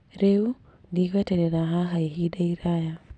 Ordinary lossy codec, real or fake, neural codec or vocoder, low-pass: none; real; none; 9.9 kHz